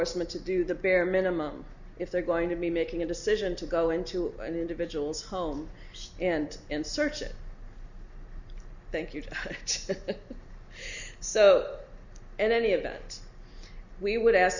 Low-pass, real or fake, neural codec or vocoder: 7.2 kHz; real; none